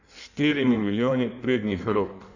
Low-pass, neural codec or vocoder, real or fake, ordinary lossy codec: 7.2 kHz; codec, 16 kHz in and 24 kHz out, 1.1 kbps, FireRedTTS-2 codec; fake; none